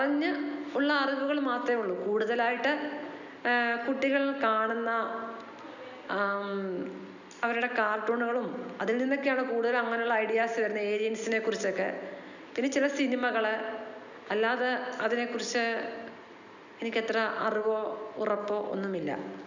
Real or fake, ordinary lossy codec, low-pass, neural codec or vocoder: real; none; 7.2 kHz; none